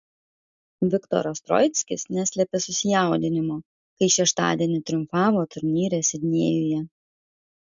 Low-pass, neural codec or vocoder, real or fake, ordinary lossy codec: 7.2 kHz; none; real; MP3, 64 kbps